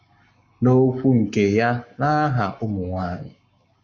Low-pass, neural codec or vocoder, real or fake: 7.2 kHz; codec, 44.1 kHz, 7.8 kbps, Pupu-Codec; fake